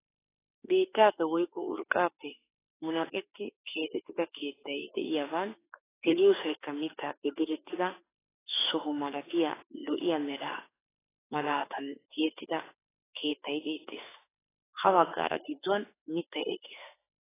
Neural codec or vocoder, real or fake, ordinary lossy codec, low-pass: autoencoder, 48 kHz, 32 numbers a frame, DAC-VAE, trained on Japanese speech; fake; AAC, 16 kbps; 3.6 kHz